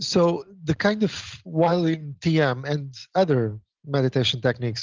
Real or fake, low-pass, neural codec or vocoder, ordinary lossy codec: real; 7.2 kHz; none; Opus, 16 kbps